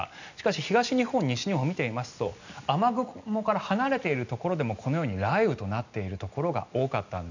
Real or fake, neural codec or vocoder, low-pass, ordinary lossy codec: real; none; 7.2 kHz; none